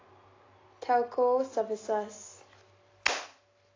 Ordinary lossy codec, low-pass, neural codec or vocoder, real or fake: AAC, 32 kbps; 7.2 kHz; none; real